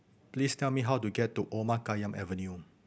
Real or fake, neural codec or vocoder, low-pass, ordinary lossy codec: real; none; none; none